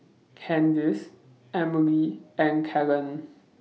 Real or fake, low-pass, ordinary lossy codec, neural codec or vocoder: real; none; none; none